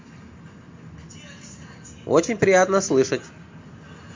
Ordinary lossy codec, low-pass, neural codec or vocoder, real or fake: MP3, 48 kbps; 7.2 kHz; none; real